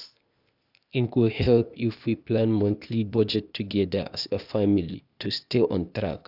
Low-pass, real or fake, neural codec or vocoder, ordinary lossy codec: 5.4 kHz; fake; codec, 16 kHz, 0.8 kbps, ZipCodec; none